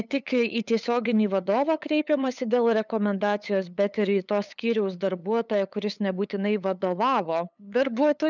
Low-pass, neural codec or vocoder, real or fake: 7.2 kHz; codec, 16 kHz, 8 kbps, FunCodec, trained on LibriTTS, 25 frames a second; fake